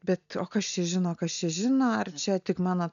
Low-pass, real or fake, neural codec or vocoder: 7.2 kHz; real; none